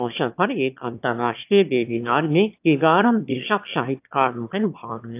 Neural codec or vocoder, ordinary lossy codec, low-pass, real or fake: autoencoder, 22.05 kHz, a latent of 192 numbers a frame, VITS, trained on one speaker; AAC, 32 kbps; 3.6 kHz; fake